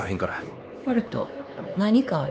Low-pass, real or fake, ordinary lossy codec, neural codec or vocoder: none; fake; none; codec, 16 kHz, 2 kbps, X-Codec, HuBERT features, trained on LibriSpeech